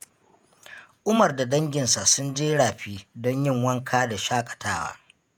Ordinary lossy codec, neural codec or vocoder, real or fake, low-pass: none; vocoder, 48 kHz, 128 mel bands, Vocos; fake; none